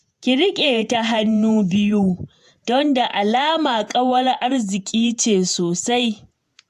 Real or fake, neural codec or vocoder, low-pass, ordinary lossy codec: fake; vocoder, 48 kHz, 128 mel bands, Vocos; 14.4 kHz; AAC, 96 kbps